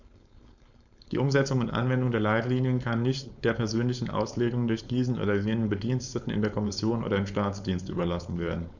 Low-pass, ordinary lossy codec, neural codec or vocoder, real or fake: 7.2 kHz; none; codec, 16 kHz, 4.8 kbps, FACodec; fake